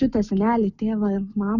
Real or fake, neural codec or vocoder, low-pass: real; none; 7.2 kHz